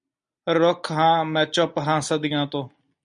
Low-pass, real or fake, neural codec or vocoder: 9.9 kHz; real; none